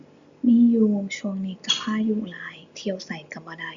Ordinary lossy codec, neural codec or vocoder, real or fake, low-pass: Opus, 64 kbps; none; real; 7.2 kHz